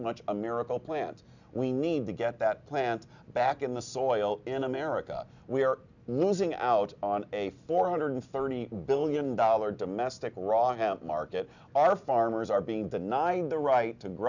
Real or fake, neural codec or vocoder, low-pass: real; none; 7.2 kHz